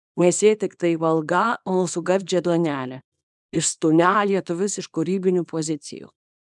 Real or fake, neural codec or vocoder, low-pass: fake; codec, 24 kHz, 0.9 kbps, WavTokenizer, small release; 10.8 kHz